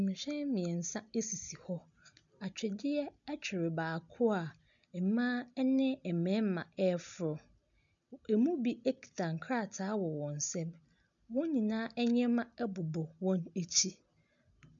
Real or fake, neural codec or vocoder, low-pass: real; none; 7.2 kHz